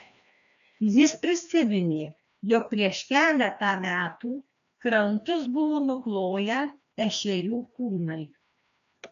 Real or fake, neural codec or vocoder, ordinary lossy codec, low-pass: fake; codec, 16 kHz, 1 kbps, FreqCodec, larger model; MP3, 96 kbps; 7.2 kHz